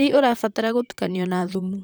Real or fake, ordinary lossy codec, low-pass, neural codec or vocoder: fake; none; none; vocoder, 44.1 kHz, 128 mel bands, Pupu-Vocoder